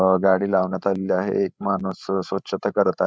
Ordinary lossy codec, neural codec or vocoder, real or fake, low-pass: none; codec, 16 kHz, 16 kbps, FreqCodec, larger model; fake; none